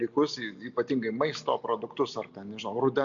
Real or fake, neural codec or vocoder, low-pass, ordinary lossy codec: real; none; 7.2 kHz; AAC, 64 kbps